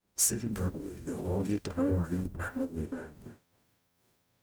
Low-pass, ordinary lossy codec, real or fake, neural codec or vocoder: none; none; fake; codec, 44.1 kHz, 0.9 kbps, DAC